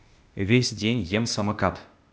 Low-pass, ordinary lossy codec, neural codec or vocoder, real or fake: none; none; codec, 16 kHz, 0.8 kbps, ZipCodec; fake